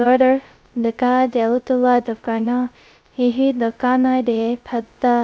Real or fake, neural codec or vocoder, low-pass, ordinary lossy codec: fake; codec, 16 kHz, 0.2 kbps, FocalCodec; none; none